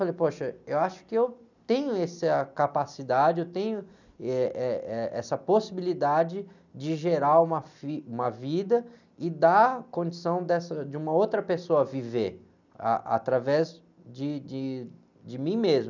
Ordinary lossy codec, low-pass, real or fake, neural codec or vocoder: none; 7.2 kHz; real; none